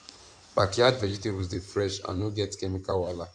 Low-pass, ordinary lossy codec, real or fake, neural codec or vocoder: 9.9 kHz; none; fake; codec, 16 kHz in and 24 kHz out, 2.2 kbps, FireRedTTS-2 codec